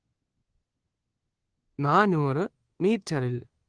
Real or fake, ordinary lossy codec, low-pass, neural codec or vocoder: fake; Opus, 16 kbps; 9.9 kHz; codec, 24 kHz, 1.2 kbps, DualCodec